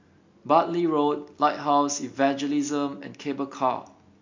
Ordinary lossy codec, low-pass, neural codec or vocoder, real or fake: MP3, 48 kbps; 7.2 kHz; none; real